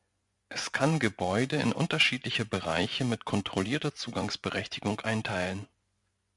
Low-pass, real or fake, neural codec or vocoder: 10.8 kHz; fake; vocoder, 24 kHz, 100 mel bands, Vocos